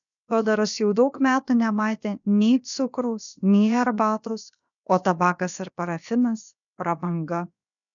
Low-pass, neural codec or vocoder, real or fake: 7.2 kHz; codec, 16 kHz, about 1 kbps, DyCAST, with the encoder's durations; fake